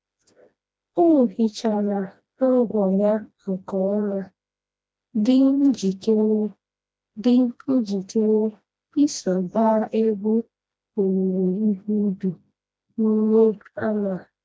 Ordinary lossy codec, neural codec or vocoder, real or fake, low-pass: none; codec, 16 kHz, 1 kbps, FreqCodec, smaller model; fake; none